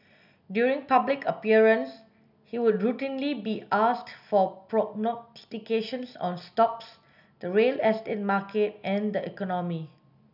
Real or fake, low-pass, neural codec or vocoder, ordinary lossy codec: real; 5.4 kHz; none; none